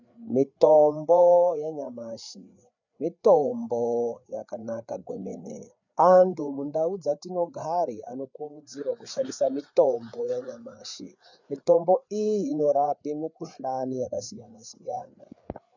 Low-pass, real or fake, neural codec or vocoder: 7.2 kHz; fake; codec, 16 kHz, 4 kbps, FreqCodec, larger model